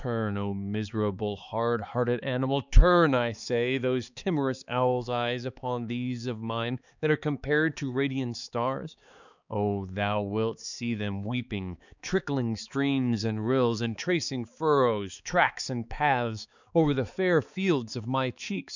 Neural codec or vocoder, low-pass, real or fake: codec, 16 kHz, 4 kbps, X-Codec, HuBERT features, trained on balanced general audio; 7.2 kHz; fake